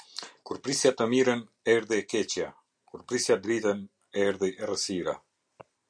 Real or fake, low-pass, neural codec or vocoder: real; 9.9 kHz; none